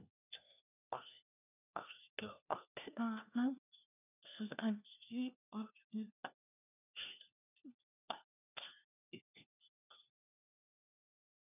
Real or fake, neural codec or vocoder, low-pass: fake; codec, 16 kHz, 1 kbps, FunCodec, trained on LibriTTS, 50 frames a second; 3.6 kHz